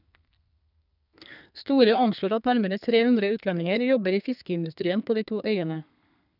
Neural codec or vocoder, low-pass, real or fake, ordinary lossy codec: codec, 32 kHz, 1.9 kbps, SNAC; 5.4 kHz; fake; none